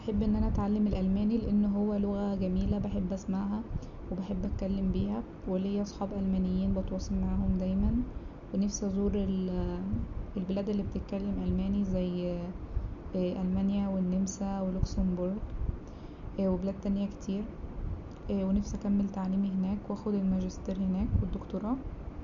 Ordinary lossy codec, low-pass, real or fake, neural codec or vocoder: none; 7.2 kHz; real; none